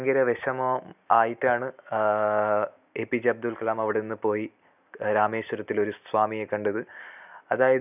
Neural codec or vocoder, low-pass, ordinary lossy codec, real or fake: none; 3.6 kHz; none; real